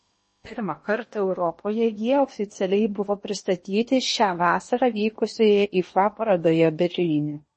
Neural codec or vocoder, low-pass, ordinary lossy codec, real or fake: codec, 16 kHz in and 24 kHz out, 0.8 kbps, FocalCodec, streaming, 65536 codes; 10.8 kHz; MP3, 32 kbps; fake